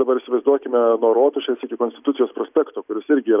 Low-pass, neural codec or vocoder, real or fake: 3.6 kHz; none; real